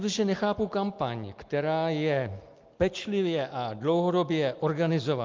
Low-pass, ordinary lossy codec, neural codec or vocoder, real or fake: 7.2 kHz; Opus, 32 kbps; none; real